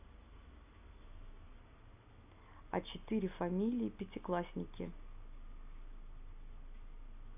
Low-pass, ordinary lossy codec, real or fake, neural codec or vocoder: 3.6 kHz; AAC, 32 kbps; real; none